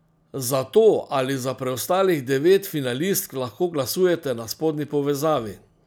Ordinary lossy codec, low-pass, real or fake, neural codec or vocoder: none; none; real; none